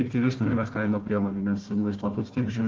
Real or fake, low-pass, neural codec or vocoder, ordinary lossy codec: fake; 7.2 kHz; codec, 16 kHz, 1 kbps, FunCodec, trained on Chinese and English, 50 frames a second; Opus, 16 kbps